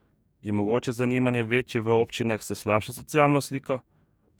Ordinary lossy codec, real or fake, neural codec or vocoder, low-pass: none; fake; codec, 44.1 kHz, 2.6 kbps, DAC; none